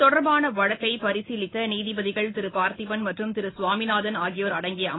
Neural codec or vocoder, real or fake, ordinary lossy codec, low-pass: none; real; AAC, 16 kbps; 7.2 kHz